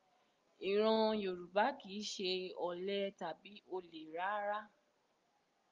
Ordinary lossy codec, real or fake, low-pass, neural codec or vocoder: Opus, 32 kbps; real; 7.2 kHz; none